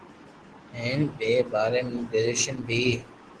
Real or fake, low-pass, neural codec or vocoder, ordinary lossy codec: real; 10.8 kHz; none; Opus, 16 kbps